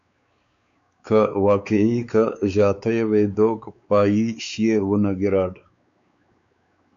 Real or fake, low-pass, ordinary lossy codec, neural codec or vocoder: fake; 7.2 kHz; AAC, 64 kbps; codec, 16 kHz, 4 kbps, X-Codec, WavLM features, trained on Multilingual LibriSpeech